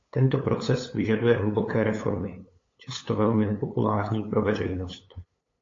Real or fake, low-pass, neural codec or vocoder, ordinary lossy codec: fake; 7.2 kHz; codec, 16 kHz, 8 kbps, FunCodec, trained on LibriTTS, 25 frames a second; AAC, 32 kbps